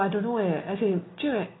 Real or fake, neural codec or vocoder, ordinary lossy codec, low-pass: real; none; AAC, 16 kbps; 7.2 kHz